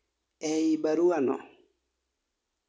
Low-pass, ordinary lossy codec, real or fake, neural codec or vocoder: none; none; real; none